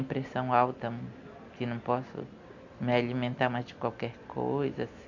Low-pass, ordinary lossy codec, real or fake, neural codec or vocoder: 7.2 kHz; none; real; none